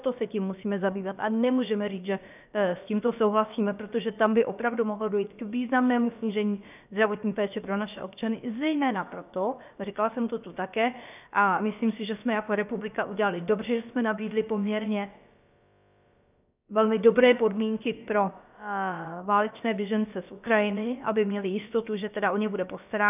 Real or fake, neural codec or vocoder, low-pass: fake; codec, 16 kHz, about 1 kbps, DyCAST, with the encoder's durations; 3.6 kHz